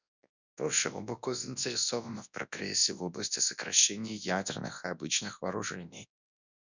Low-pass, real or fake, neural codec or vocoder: 7.2 kHz; fake; codec, 24 kHz, 0.9 kbps, WavTokenizer, large speech release